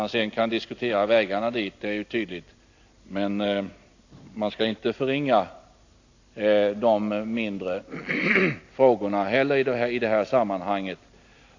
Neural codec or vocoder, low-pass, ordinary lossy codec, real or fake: none; 7.2 kHz; AAC, 48 kbps; real